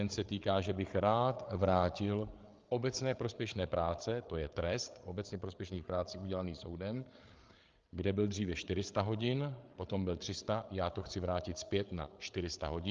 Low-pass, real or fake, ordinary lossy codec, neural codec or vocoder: 7.2 kHz; fake; Opus, 24 kbps; codec, 16 kHz, 8 kbps, FreqCodec, larger model